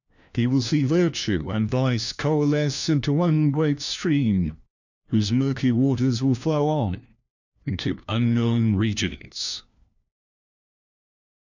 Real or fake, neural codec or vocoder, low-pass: fake; codec, 16 kHz, 1 kbps, FunCodec, trained on LibriTTS, 50 frames a second; 7.2 kHz